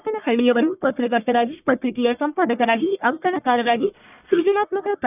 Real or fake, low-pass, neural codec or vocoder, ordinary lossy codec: fake; 3.6 kHz; codec, 44.1 kHz, 1.7 kbps, Pupu-Codec; none